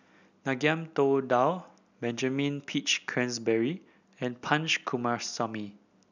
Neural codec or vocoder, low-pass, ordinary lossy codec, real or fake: none; 7.2 kHz; none; real